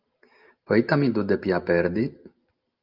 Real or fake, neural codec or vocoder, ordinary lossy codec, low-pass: real; none; Opus, 24 kbps; 5.4 kHz